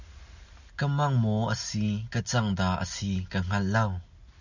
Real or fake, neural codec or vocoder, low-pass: real; none; 7.2 kHz